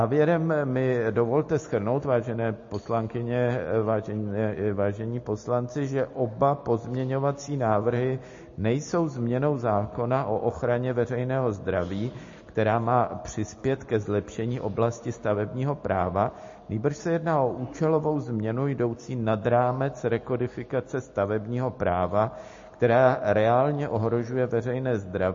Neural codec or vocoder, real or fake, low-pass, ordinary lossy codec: none; real; 7.2 kHz; MP3, 32 kbps